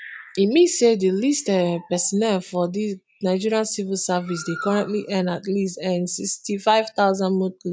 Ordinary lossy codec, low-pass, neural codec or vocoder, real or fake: none; none; none; real